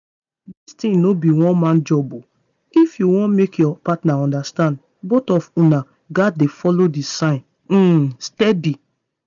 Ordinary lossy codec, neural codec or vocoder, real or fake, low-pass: none; none; real; 7.2 kHz